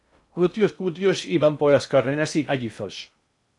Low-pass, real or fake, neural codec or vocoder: 10.8 kHz; fake; codec, 16 kHz in and 24 kHz out, 0.6 kbps, FocalCodec, streaming, 2048 codes